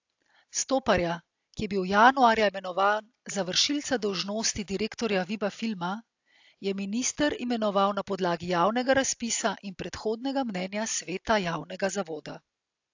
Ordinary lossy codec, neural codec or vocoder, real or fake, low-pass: AAC, 48 kbps; none; real; 7.2 kHz